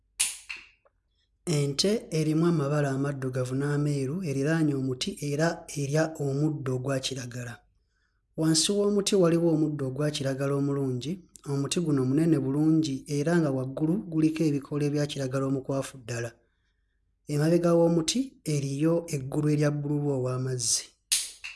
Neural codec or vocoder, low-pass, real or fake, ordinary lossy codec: none; none; real; none